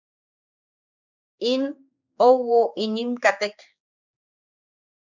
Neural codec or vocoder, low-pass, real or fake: codec, 16 kHz, 4 kbps, X-Codec, HuBERT features, trained on general audio; 7.2 kHz; fake